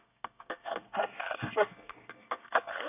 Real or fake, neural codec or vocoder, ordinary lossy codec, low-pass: fake; codec, 24 kHz, 1 kbps, SNAC; none; 3.6 kHz